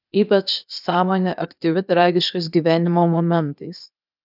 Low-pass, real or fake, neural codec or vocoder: 5.4 kHz; fake; codec, 16 kHz, 0.8 kbps, ZipCodec